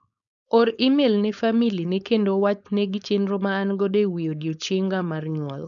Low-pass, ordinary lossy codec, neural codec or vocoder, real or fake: 7.2 kHz; none; codec, 16 kHz, 4.8 kbps, FACodec; fake